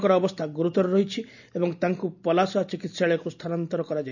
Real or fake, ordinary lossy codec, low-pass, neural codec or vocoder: real; none; 7.2 kHz; none